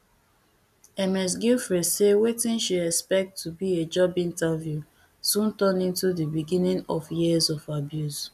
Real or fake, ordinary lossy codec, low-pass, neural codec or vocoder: real; none; 14.4 kHz; none